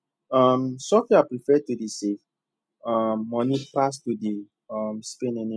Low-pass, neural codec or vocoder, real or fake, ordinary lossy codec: none; none; real; none